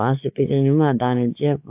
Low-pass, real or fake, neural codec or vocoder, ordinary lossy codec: 3.6 kHz; fake; codec, 16 kHz, 4 kbps, X-Codec, WavLM features, trained on Multilingual LibriSpeech; AAC, 32 kbps